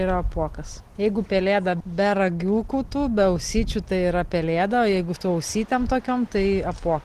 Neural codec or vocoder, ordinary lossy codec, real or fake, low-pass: none; Opus, 16 kbps; real; 14.4 kHz